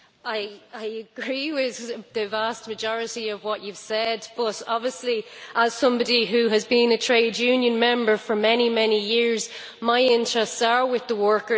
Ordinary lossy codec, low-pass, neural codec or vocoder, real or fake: none; none; none; real